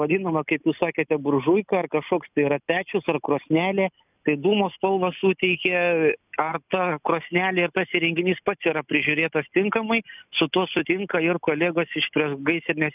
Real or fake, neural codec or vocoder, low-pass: real; none; 3.6 kHz